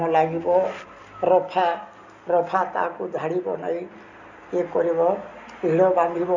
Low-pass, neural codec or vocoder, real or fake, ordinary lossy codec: 7.2 kHz; none; real; none